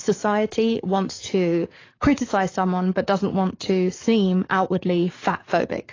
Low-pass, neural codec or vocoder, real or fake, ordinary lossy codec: 7.2 kHz; codec, 44.1 kHz, 7.8 kbps, DAC; fake; AAC, 32 kbps